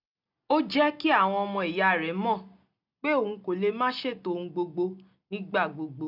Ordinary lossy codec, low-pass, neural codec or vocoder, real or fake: none; 5.4 kHz; none; real